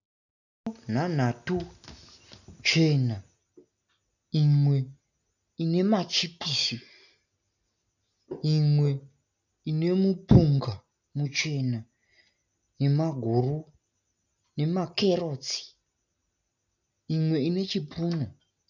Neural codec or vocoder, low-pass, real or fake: none; 7.2 kHz; real